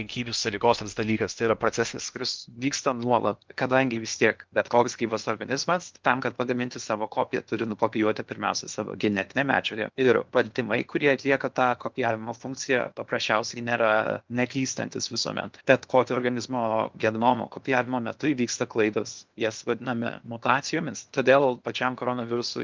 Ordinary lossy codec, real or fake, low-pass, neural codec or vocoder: Opus, 24 kbps; fake; 7.2 kHz; codec, 16 kHz in and 24 kHz out, 0.8 kbps, FocalCodec, streaming, 65536 codes